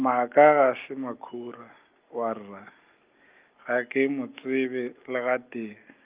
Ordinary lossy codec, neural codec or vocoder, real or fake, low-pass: Opus, 32 kbps; none; real; 3.6 kHz